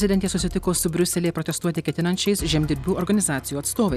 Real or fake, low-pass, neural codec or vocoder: real; 14.4 kHz; none